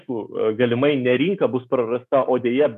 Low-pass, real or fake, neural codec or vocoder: 14.4 kHz; fake; autoencoder, 48 kHz, 128 numbers a frame, DAC-VAE, trained on Japanese speech